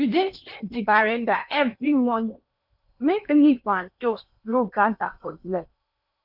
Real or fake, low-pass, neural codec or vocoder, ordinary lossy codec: fake; 5.4 kHz; codec, 16 kHz in and 24 kHz out, 0.8 kbps, FocalCodec, streaming, 65536 codes; none